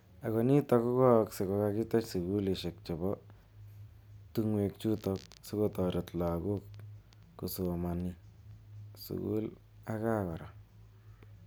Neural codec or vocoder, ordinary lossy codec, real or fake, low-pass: none; none; real; none